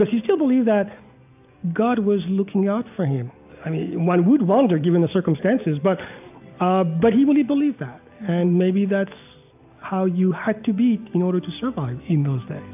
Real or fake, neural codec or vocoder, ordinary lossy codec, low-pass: real; none; AAC, 32 kbps; 3.6 kHz